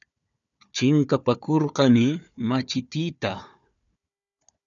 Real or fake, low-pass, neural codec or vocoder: fake; 7.2 kHz; codec, 16 kHz, 4 kbps, FunCodec, trained on Chinese and English, 50 frames a second